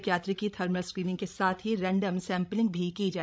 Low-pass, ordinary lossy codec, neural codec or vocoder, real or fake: none; none; codec, 16 kHz, 16 kbps, FreqCodec, larger model; fake